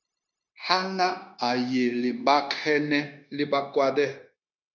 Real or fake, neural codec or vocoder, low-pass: fake; codec, 16 kHz, 0.9 kbps, LongCat-Audio-Codec; 7.2 kHz